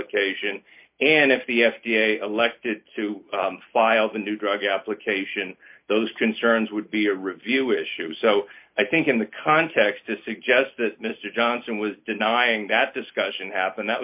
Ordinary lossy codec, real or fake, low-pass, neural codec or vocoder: MP3, 32 kbps; real; 3.6 kHz; none